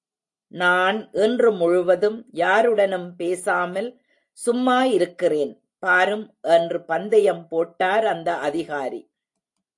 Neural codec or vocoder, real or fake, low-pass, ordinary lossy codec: none; real; 10.8 kHz; AAC, 64 kbps